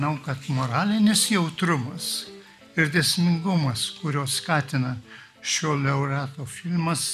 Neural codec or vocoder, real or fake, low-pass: none; real; 14.4 kHz